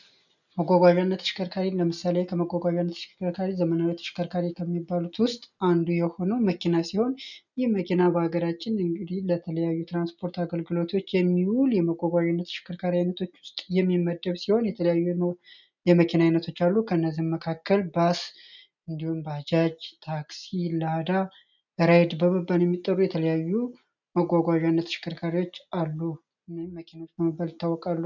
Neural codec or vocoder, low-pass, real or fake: none; 7.2 kHz; real